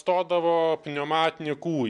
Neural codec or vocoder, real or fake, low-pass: none; real; 10.8 kHz